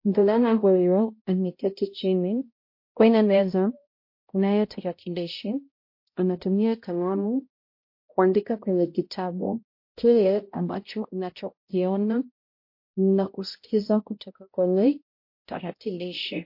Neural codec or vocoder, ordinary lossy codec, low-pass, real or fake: codec, 16 kHz, 0.5 kbps, X-Codec, HuBERT features, trained on balanced general audio; MP3, 32 kbps; 5.4 kHz; fake